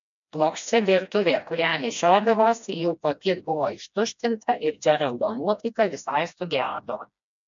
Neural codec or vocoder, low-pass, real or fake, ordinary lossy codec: codec, 16 kHz, 1 kbps, FreqCodec, smaller model; 7.2 kHz; fake; MP3, 64 kbps